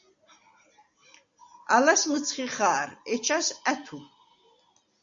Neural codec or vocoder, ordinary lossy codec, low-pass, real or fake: none; MP3, 48 kbps; 7.2 kHz; real